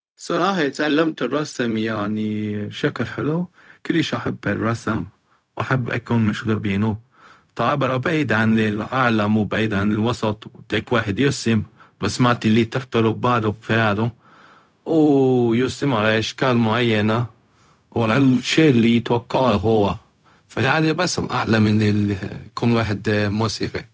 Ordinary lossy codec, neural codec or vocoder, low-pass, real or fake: none; codec, 16 kHz, 0.4 kbps, LongCat-Audio-Codec; none; fake